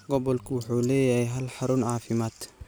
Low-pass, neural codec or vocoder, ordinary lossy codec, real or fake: none; vocoder, 44.1 kHz, 128 mel bands every 256 samples, BigVGAN v2; none; fake